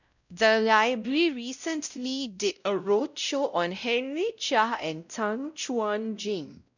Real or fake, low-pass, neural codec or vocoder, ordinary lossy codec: fake; 7.2 kHz; codec, 16 kHz, 0.5 kbps, X-Codec, WavLM features, trained on Multilingual LibriSpeech; none